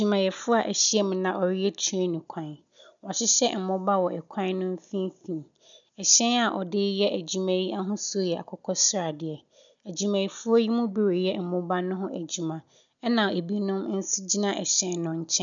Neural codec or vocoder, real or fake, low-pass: none; real; 7.2 kHz